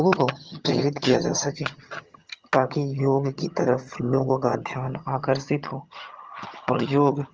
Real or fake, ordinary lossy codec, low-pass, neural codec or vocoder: fake; Opus, 24 kbps; 7.2 kHz; vocoder, 22.05 kHz, 80 mel bands, HiFi-GAN